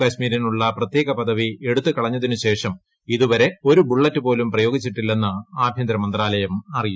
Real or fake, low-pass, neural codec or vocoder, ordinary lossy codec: real; none; none; none